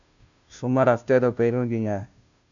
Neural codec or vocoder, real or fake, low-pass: codec, 16 kHz, 0.5 kbps, FunCodec, trained on Chinese and English, 25 frames a second; fake; 7.2 kHz